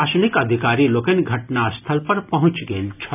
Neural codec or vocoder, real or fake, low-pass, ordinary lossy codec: none; real; 3.6 kHz; none